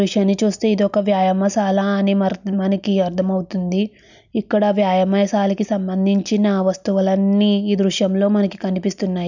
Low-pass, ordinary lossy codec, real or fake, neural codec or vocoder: 7.2 kHz; none; real; none